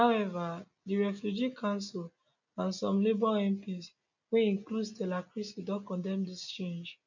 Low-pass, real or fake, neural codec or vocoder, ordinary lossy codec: 7.2 kHz; real; none; none